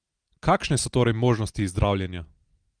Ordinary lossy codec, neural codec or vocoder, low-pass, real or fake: Opus, 24 kbps; none; 9.9 kHz; real